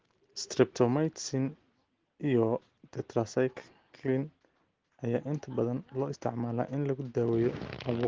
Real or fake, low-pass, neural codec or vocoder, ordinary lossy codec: real; 7.2 kHz; none; Opus, 16 kbps